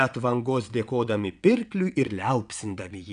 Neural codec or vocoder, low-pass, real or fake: vocoder, 22.05 kHz, 80 mel bands, WaveNeXt; 9.9 kHz; fake